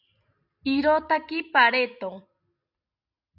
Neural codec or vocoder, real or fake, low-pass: none; real; 5.4 kHz